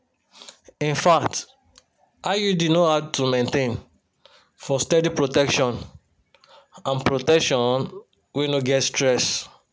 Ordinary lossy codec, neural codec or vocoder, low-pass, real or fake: none; none; none; real